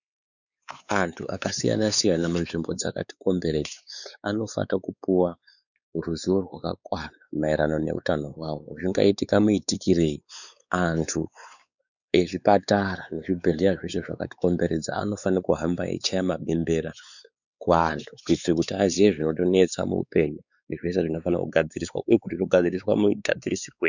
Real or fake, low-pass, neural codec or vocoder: fake; 7.2 kHz; codec, 16 kHz, 4 kbps, X-Codec, WavLM features, trained on Multilingual LibriSpeech